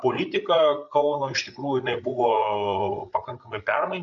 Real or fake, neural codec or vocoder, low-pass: fake; codec, 16 kHz, 8 kbps, FreqCodec, larger model; 7.2 kHz